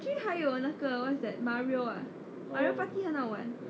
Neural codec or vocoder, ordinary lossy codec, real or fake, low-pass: none; none; real; none